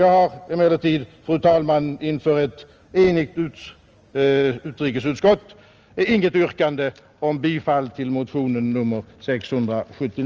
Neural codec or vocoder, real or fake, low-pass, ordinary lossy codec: none; real; 7.2 kHz; Opus, 24 kbps